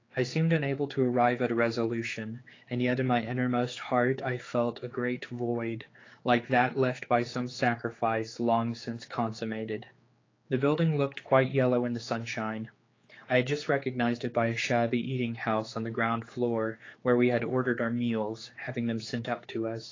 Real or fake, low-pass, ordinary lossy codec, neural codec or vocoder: fake; 7.2 kHz; AAC, 32 kbps; codec, 16 kHz, 4 kbps, X-Codec, HuBERT features, trained on general audio